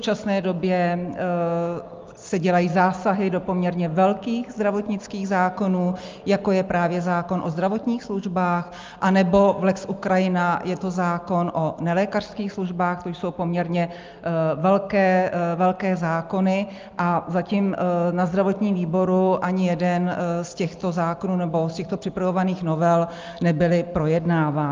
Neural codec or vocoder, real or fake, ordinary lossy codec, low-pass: none; real; Opus, 24 kbps; 7.2 kHz